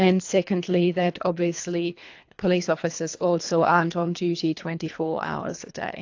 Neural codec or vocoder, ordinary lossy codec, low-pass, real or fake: codec, 24 kHz, 3 kbps, HILCodec; AAC, 48 kbps; 7.2 kHz; fake